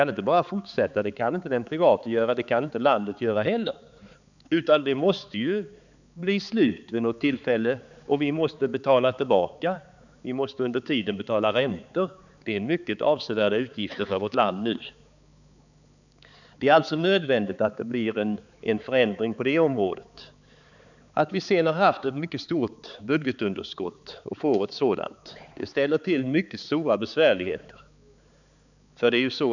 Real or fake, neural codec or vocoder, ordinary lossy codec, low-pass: fake; codec, 16 kHz, 4 kbps, X-Codec, HuBERT features, trained on balanced general audio; none; 7.2 kHz